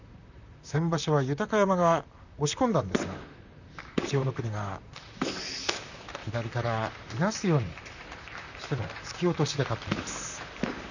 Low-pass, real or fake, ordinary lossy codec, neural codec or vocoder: 7.2 kHz; fake; none; vocoder, 44.1 kHz, 128 mel bands, Pupu-Vocoder